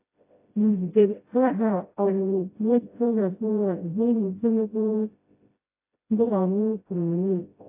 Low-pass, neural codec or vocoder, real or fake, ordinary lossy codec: 3.6 kHz; codec, 16 kHz, 0.5 kbps, FreqCodec, smaller model; fake; AAC, 24 kbps